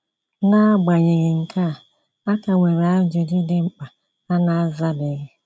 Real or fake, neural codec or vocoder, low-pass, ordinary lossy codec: real; none; none; none